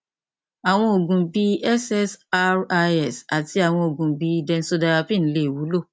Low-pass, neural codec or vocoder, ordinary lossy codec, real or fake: none; none; none; real